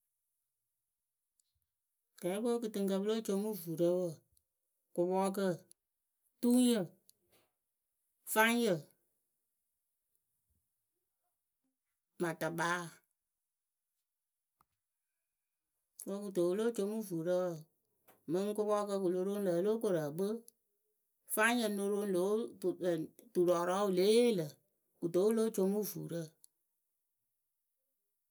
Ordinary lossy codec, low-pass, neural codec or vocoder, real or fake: none; none; none; real